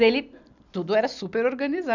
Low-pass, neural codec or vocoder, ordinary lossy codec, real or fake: 7.2 kHz; none; none; real